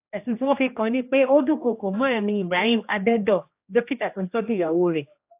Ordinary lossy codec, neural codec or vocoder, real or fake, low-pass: AAC, 32 kbps; codec, 16 kHz, 1 kbps, X-Codec, HuBERT features, trained on general audio; fake; 3.6 kHz